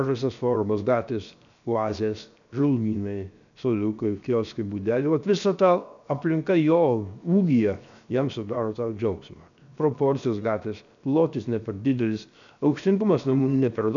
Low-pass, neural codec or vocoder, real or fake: 7.2 kHz; codec, 16 kHz, 0.7 kbps, FocalCodec; fake